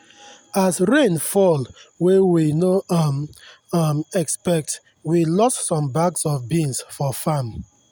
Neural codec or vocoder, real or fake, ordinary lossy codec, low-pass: none; real; none; none